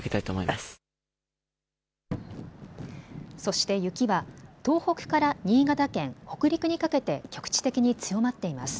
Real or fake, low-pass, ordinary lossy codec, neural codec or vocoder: real; none; none; none